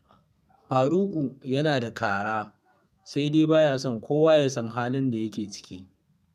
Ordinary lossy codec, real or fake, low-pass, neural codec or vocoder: none; fake; 14.4 kHz; codec, 32 kHz, 1.9 kbps, SNAC